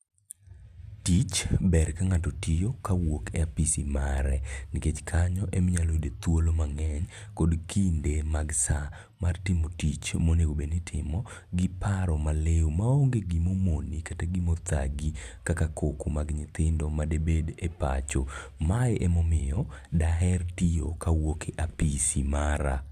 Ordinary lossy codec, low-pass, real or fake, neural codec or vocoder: none; 14.4 kHz; real; none